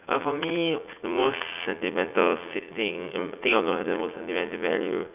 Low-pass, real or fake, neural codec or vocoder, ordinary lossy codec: 3.6 kHz; fake; vocoder, 44.1 kHz, 80 mel bands, Vocos; none